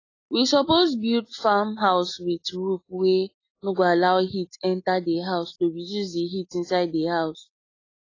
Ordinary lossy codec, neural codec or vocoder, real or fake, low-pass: AAC, 32 kbps; none; real; 7.2 kHz